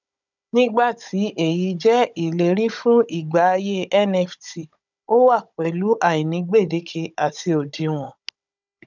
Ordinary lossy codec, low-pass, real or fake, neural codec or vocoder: none; 7.2 kHz; fake; codec, 16 kHz, 16 kbps, FunCodec, trained on Chinese and English, 50 frames a second